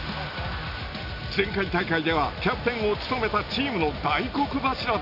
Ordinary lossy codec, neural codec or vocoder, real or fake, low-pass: none; none; real; 5.4 kHz